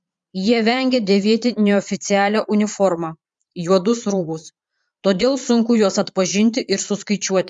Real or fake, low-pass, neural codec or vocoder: fake; 9.9 kHz; vocoder, 22.05 kHz, 80 mel bands, Vocos